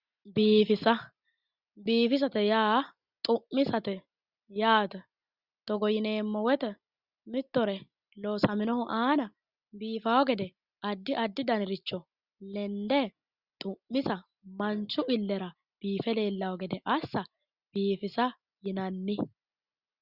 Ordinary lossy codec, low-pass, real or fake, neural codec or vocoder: Opus, 64 kbps; 5.4 kHz; real; none